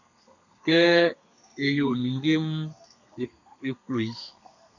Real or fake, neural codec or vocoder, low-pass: fake; codec, 32 kHz, 1.9 kbps, SNAC; 7.2 kHz